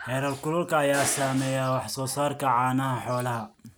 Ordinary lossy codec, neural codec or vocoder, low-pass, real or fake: none; none; none; real